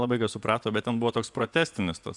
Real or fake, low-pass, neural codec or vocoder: fake; 10.8 kHz; vocoder, 24 kHz, 100 mel bands, Vocos